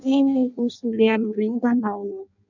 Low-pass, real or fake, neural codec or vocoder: 7.2 kHz; fake; codec, 16 kHz in and 24 kHz out, 0.6 kbps, FireRedTTS-2 codec